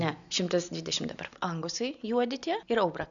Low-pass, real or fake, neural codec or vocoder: 7.2 kHz; real; none